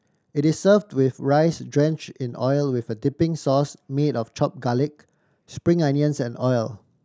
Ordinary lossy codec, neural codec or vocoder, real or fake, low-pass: none; none; real; none